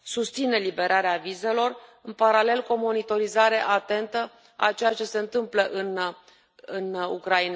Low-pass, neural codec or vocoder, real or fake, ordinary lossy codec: none; none; real; none